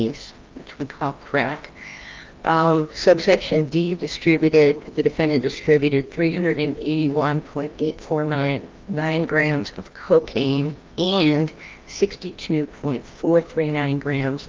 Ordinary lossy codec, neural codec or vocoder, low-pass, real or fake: Opus, 24 kbps; codec, 16 kHz, 1 kbps, FreqCodec, larger model; 7.2 kHz; fake